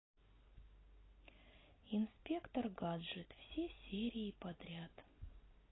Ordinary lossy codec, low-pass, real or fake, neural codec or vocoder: AAC, 16 kbps; 7.2 kHz; real; none